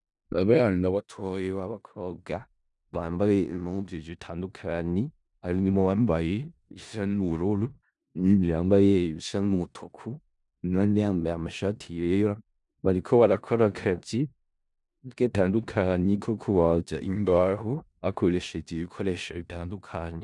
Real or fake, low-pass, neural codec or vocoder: fake; 10.8 kHz; codec, 16 kHz in and 24 kHz out, 0.4 kbps, LongCat-Audio-Codec, four codebook decoder